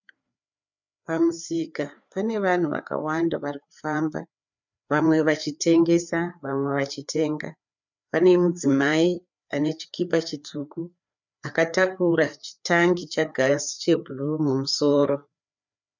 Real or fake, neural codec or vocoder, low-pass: fake; codec, 16 kHz, 4 kbps, FreqCodec, larger model; 7.2 kHz